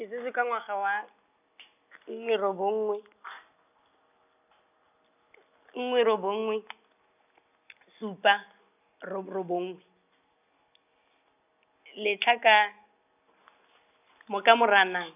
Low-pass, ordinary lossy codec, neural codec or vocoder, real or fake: 3.6 kHz; none; none; real